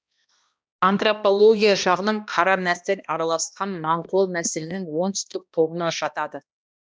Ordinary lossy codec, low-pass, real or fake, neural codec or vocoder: none; none; fake; codec, 16 kHz, 1 kbps, X-Codec, HuBERT features, trained on balanced general audio